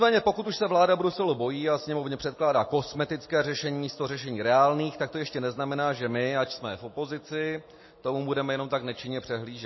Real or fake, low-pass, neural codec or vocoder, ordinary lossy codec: real; 7.2 kHz; none; MP3, 24 kbps